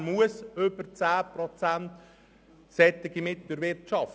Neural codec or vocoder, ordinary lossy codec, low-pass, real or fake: none; none; none; real